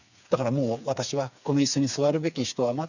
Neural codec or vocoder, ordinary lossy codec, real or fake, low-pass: codec, 16 kHz, 4 kbps, FreqCodec, smaller model; none; fake; 7.2 kHz